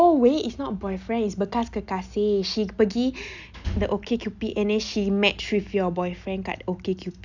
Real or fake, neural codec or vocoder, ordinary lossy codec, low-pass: real; none; none; 7.2 kHz